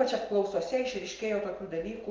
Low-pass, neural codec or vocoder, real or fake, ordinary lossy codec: 7.2 kHz; none; real; Opus, 32 kbps